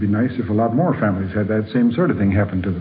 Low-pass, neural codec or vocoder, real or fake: 7.2 kHz; none; real